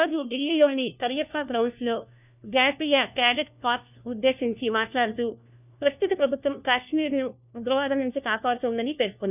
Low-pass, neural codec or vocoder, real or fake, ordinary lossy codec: 3.6 kHz; codec, 16 kHz, 1 kbps, FunCodec, trained on LibriTTS, 50 frames a second; fake; none